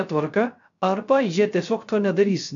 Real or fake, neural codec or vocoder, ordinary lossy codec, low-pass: fake; codec, 16 kHz, 0.3 kbps, FocalCodec; MP3, 64 kbps; 7.2 kHz